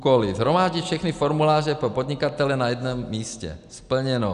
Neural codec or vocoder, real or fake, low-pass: none; real; 10.8 kHz